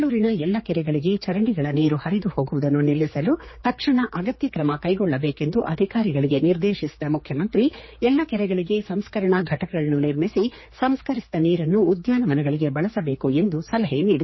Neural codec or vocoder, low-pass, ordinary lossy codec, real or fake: codec, 16 kHz, 4 kbps, X-Codec, HuBERT features, trained on general audio; 7.2 kHz; MP3, 24 kbps; fake